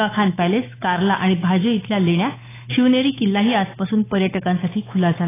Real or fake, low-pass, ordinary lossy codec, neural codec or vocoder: fake; 3.6 kHz; AAC, 16 kbps; codec, 16 kHz, 8 kbps, FunCodec, trained on Chinese and English, 25 frames a second